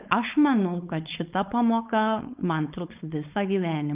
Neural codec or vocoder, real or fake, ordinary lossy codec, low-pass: codec, 16 kHz, 4.8 kbps, FACodec; fake; Opus, 24 kbps; 3.6 kHz